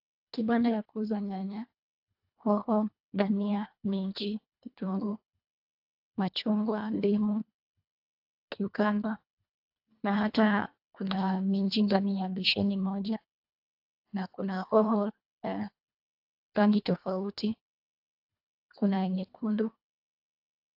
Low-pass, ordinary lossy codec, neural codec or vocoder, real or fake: 5.4 kHz; AAC, 48 kbps; codec, 24 kHz, 1.5 kbps, HILCodec; fake